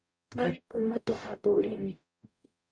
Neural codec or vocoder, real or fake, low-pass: codec, 44.1 kHz, 0.9 kbps, DAC; fake; 9.9 kHz